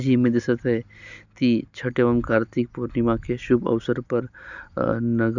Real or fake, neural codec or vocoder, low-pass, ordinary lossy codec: real; none; 7.2 kHz; none